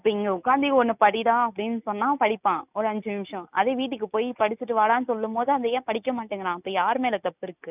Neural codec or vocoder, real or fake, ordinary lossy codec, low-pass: none; real; none; 3.6 kHz